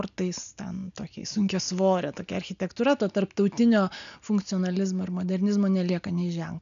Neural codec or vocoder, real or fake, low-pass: none; real; 7.2 kHz